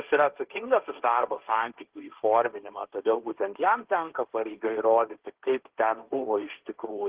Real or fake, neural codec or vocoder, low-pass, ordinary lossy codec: fake; codec, 16 kHz, 1.1 kbps, Voila-Tokenizer; 3.6 kHz; Opus, 16 kbps